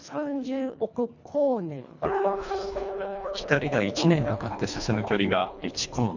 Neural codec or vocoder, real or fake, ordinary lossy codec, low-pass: codec, 24 kHz, 1.5 kbps, HILCodec; fake; none; 7.2 kHz